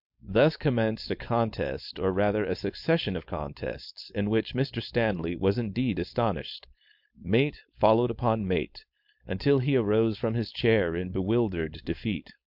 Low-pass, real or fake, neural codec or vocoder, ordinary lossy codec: 5.4 kHz; fake; codec, 16 kHz, 4.8 kbps, FACodec; MP3, 48 kbps